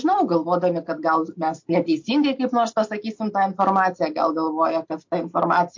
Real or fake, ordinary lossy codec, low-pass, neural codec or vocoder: real; MP3, 48 kbps; 7.2 kHz; none